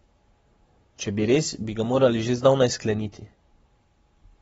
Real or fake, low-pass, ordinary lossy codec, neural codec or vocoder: fake; 19.8 kHz; AAC, 24 kbps; codec, 44.1 kHz, 7.8 kbps, Pupu-Codec